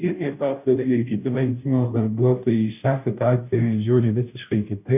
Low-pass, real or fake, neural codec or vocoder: 3.6 kHz; fake; codec, 16 kHz, 0.5 kbps, FunCodec, trained on Chinese and English, 25 frames a second